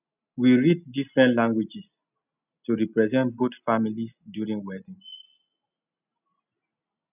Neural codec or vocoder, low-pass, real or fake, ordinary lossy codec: none; 3.6 kHz; real; none